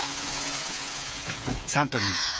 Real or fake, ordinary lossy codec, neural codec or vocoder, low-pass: fake; none; codec, 16 kHz, 8 kbps, FreqCodec, smaller model; none